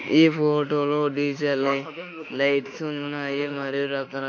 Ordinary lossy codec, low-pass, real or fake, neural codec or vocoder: MP3, 48 kbps; 7.2 kHz; fake; autoencoder, 48 kHz, 32 numbers a frame, DAC-VAE, trained on Japanese speech